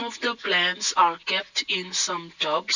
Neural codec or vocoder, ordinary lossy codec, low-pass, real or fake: none; none; 7.2 kHz; real